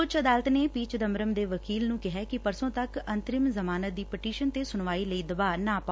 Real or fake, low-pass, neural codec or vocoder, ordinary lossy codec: real; none; none; none